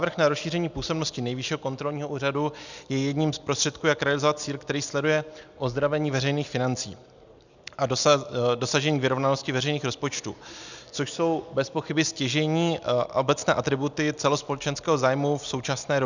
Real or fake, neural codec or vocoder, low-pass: real; none; 7.2 kHz